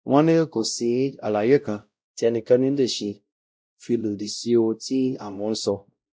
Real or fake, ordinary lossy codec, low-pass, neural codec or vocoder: fake; none; none; codec, 16 kHz, 0.5 kbps, X-Codec, WavLM features, trained on Multilingual LibriSpeech